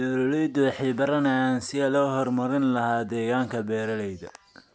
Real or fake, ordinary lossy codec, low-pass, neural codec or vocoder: real; none; none; none